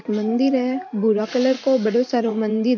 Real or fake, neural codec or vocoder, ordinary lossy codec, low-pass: fake; vocoder, 44.1 kHz, 128 mel bands every 512 samples, BigVGAN v2; MP3, 48 kbps; 7.2 kHz